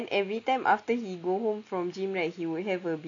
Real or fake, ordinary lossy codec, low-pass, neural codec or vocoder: real; AAC, 48 kbps; 7.2 kHz; none